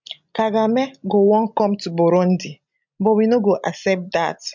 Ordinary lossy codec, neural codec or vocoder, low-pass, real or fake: MP3, 64 kbps; none; 7.2 kHz; real